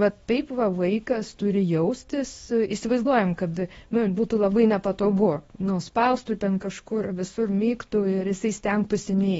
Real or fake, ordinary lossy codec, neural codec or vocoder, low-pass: fake; AAC, 24 kbps; codec, 24 kHz, 0.9 kbps, WavTokenizer, small release; 10.8 kHz